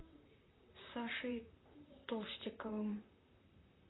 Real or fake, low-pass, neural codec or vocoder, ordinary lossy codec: fake; 7.2 kHz; vocoder, 44.1 kHz, 128 mel bands, Pupu-Vocoder; AAC, 16 kbps